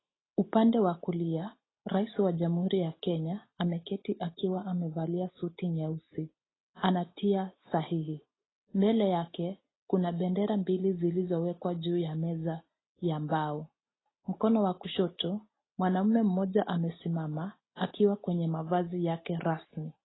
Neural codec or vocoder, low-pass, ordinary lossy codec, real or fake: none; 7.2 kHz; AAC, 16 kbps; real